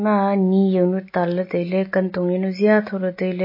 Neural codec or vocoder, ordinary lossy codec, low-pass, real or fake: none; MP3, 24 kbps; 5.4 kHz; real